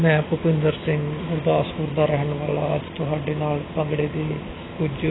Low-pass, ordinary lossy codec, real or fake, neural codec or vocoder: 7.2 kHz; AAC, 16 kbps; real; none